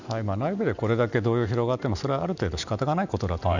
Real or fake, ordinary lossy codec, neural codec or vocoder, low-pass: real; none; none; 7.2 kHz